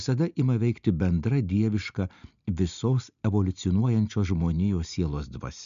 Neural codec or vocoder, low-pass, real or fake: none; 7.2 kHz; real